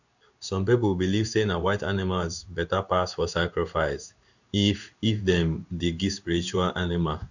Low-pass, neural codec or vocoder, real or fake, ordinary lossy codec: 7.2 kHz; codec, 16 kHz in and 24 kHz out, 1 kbps, XY-Tokenizer; fake; none